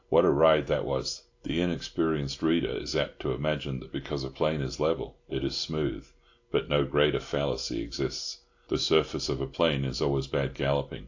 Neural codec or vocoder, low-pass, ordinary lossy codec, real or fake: none; 7.2 kHz; AAC, 48 kbps; real